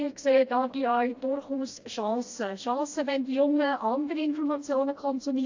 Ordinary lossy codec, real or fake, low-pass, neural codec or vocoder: AAC, 48 kbps; fake; 7.2 kHz; codec, 16 kHz, 1 kbps, FreqCodec, smaller model